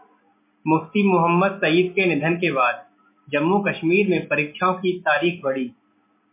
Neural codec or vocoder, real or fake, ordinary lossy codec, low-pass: none; real; MP3, 24 kbps; 3.6 kHz